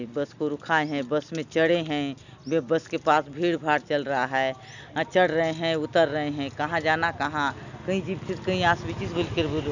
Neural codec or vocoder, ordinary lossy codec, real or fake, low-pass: none; none; real; 7.2 kHz